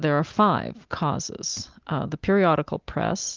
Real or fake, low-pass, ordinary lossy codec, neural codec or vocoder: real; 7.2 kHz; Opus, 32 kbps; none